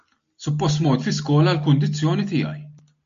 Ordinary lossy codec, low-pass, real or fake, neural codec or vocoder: MP3, 48 kbps; 7.2 kHz; real; none